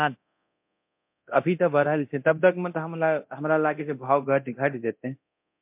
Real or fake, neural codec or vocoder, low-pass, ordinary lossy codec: fake; codec, 24 kHz, 0.9 kbps, DualCodec; 3.6 kHz; MP3, 32 kbps